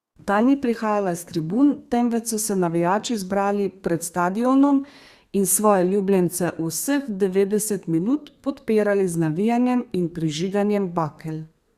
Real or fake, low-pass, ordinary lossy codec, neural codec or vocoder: fake; 14.4 kHz; Opus, 64 kbps; codec, 32 kHz, 1.9 kbps, SNAC